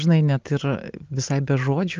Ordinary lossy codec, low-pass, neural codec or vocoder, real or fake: Opus, 24 kbps; 7.2 kHz; none; real